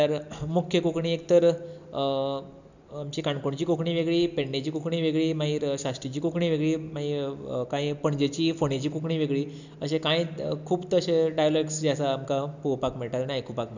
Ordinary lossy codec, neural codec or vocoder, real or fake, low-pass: none; none; real; 7.2 kHz